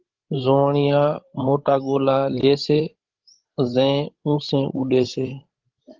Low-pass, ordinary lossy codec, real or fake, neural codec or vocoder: 7.2 kHz; Opus, 16 kbps; fake; codec, 16 kHz, 16 kbps, FreqCodec, larger model